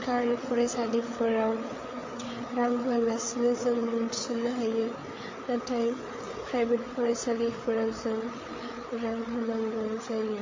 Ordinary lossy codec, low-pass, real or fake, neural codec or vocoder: MP3, 32 kbps; 7.2 kHz; fake; codec, 16 kHz, 8 kbps, FreqCodec, larger model